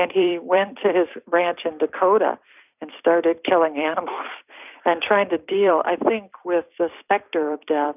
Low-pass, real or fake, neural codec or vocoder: 3.6 kHz; fake; vocoder, 44.1 kHz, 128 mel bands every 256 samples, BigVGAN v2